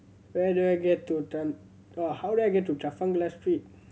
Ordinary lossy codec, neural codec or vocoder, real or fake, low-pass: none; none; real; none